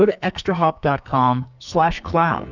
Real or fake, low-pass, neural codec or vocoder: fake; 7.2 kHz; codec, 44.1 kHz, 2.6 kbps, SNAC